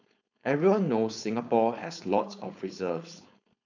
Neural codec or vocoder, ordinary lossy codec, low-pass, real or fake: codec, 16 kHz, 4.8 kbps, FACodec; none; 7.2 kHz; fake